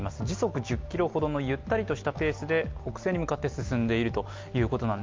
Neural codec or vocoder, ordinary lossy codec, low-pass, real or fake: none; Opus, 24 kbps; 7.2 kHz; real